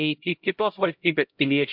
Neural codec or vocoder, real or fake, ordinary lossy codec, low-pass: codec, 16 kHz, 0.5 kbps, X-Codec, HuBERT features, trained on LibriSpeech; fake; MP3, 48 kbps; 5.4 kHz